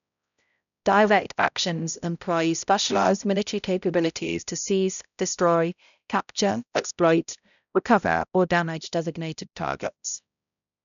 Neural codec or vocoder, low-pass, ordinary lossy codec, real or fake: codec, 16 kHz, 0.5 kbps, X-Codec, HuBERT features, trained on balanced general audio; 7.2 kHz; none; fake